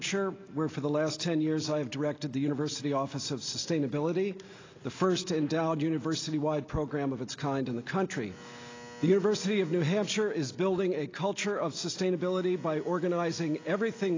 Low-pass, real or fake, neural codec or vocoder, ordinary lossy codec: 7.2 kHz; real; none; AAC, 32 kbps